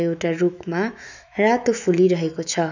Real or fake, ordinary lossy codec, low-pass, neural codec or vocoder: fake; none; 7.2 kHz; autoencoder, 48 kHz, 128 numbers a frame, DAC-VAE, trained on Japanese speech